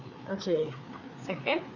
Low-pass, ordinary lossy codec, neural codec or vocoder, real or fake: 7.2 kHz; none; codec, 16 kHz, 4 kbps, FreqCodec, larger model; fake